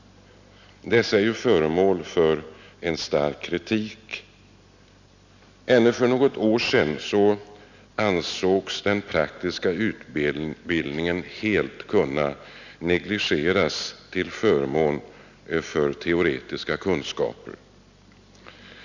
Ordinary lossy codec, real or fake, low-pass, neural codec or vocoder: none; real; 7.2 kHz; none